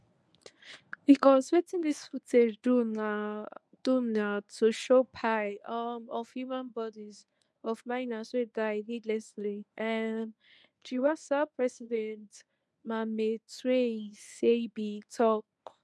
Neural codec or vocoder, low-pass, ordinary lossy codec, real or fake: codec, 24 kHz, 0.9 kbps, WavTokenizer, medium speech release version 1; none; none; fake